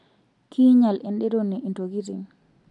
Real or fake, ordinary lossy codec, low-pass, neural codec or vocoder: real; AAC, 64 kbps; 10.8 kHz; none